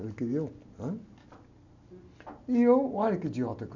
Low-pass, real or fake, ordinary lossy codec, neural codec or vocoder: 7.2 kHz; real; none; none